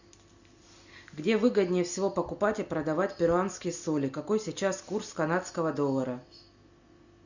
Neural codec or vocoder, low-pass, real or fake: none; 7.2 kHz; real